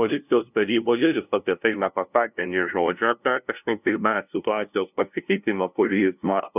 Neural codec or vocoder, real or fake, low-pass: codec, 16 kHz, 0.5 kbps, FunCodec, trained on LibriTTS, 25 frames a second; fake; 3.6 kHz